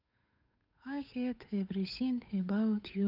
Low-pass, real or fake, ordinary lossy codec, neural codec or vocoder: 5.4 kHz; fake; none; codec, 16 kHz, 8 kbps, FunCodec, trained on Chinese and English, 25 frames a second